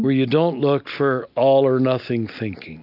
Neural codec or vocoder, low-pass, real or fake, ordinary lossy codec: none; 5.4 kHz; real; AAC, 48 kbps